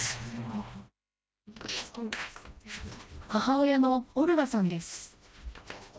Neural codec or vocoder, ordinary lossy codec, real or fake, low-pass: codec, 16 kHz, 1 kbps, FreqCodec, smaller model; none; fake; none